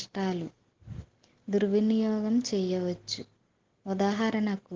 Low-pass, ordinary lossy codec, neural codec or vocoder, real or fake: 7.2 kHz; Opus, 16 kbps; none; real